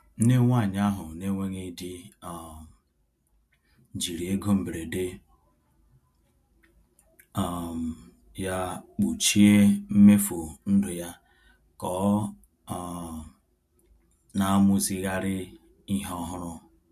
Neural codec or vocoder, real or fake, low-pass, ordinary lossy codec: none; real; 14.4 kHz; MP3, 64 kbps